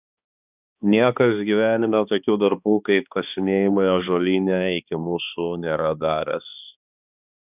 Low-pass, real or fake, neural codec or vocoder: 3.6 kHz; fake; codec, 16 kHz, 2 kbps, X-Codec, HuBERT features, trained on balanced general audio